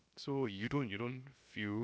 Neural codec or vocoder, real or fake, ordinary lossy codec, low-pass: codec, 16 kHz, 0.7 kbps, FocalCodec; fake; none; none